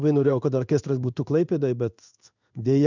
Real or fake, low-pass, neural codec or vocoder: fake; 7.2 kHz; codec, 16 kHz in and 24 kHz out, 1 kbps, XY-Tokenizer